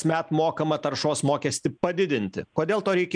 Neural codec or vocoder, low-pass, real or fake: none; 9.9 kHz; real